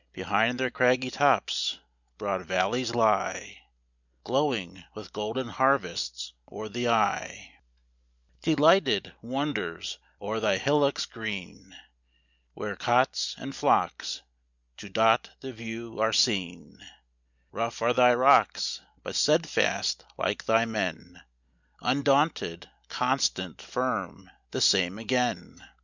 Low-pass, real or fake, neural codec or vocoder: 7.2 kHz; real; none